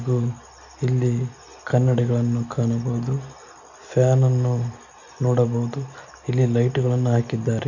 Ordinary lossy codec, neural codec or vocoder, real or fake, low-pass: none; none; real; 7.2 kHz